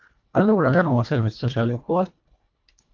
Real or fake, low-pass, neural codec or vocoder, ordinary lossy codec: fake; 7.2 kHz; codec, 24 kHz, 1.5 kbps, HILCodec; Opus, 32 kbps